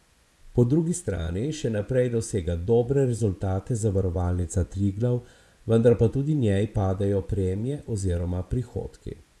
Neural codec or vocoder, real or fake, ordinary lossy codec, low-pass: vocoder, 24 kHz, 100 mel bands, Vocos; fake; none; none